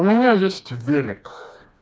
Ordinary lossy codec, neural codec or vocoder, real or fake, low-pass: none; codec, 16 kHz, 2 kbps, FreqCodec, smaller model; fake; none